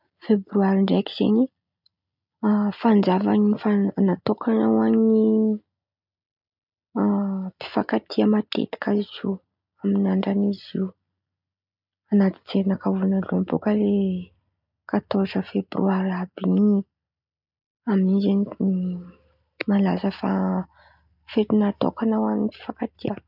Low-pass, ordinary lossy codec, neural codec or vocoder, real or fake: 5.4 kHz; none; none; real